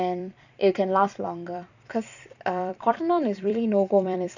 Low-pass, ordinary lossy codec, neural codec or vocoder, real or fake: 7.2 kHz; none; vocoder, 44.1 kHz, 128 mel bands every 256 samples, BigVGAN v2; fake